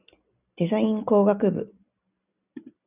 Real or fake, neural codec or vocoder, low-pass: real; none; 3.6 kHz